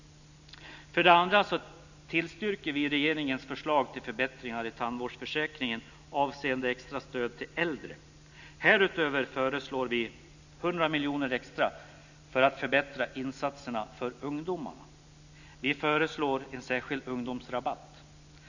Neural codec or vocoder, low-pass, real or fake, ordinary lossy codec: none; 7.2 kHz; real; none